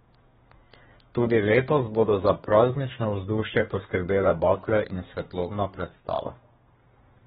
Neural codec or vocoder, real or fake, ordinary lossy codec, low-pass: codec, 32 kHz, 1.9 kbps, SNAC; fake; AAC, 16 kbps; 14.4 kHz